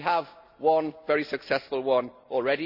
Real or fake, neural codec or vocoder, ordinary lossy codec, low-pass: real; none; Opus, 64 kbps; 5.4 kHz